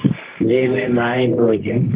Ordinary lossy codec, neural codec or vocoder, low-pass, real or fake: Opus, 16 kbps; codec, 24 kHz, 0.9 kbps, WavTokenizer, medium music audio release; 3.6 kHz; fake